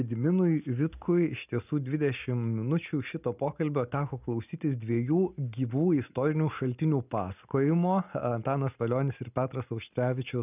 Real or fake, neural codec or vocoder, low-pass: real; none; 3.6 kHz